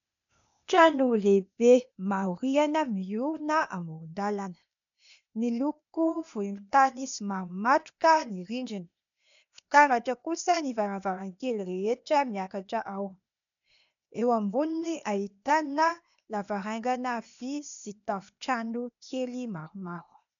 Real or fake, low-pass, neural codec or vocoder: fake; 7.2 kHz; codec, 16 kHz, 0.8 kbps, ZipCodec